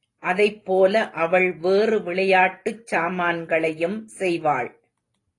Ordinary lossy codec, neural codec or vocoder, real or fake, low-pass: AAC, 32 kbps; none; real; 10.8 kHz